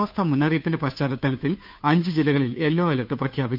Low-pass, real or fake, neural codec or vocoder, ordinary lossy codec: 5.4 kHz; fake; codec, 16 kHz, 2 kbps, FunCodec, trained on Chinese and English, 25 frames a second; none